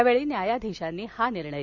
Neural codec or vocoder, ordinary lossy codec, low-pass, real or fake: none; none; 7.2 kHz; real